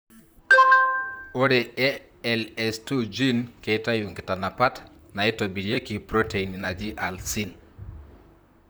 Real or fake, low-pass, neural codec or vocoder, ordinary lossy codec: fake; none; vocoder, 44.1 kHz, 128 mel bands, Pupu-Vocoder; none